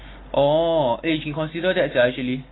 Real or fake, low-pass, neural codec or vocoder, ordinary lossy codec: real; 7.2 kHz; none; AAC, 16 kbps